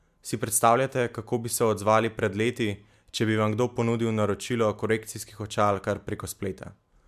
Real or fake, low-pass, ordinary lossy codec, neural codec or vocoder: real; 14.4 kHz; MP3, 96 kbps; none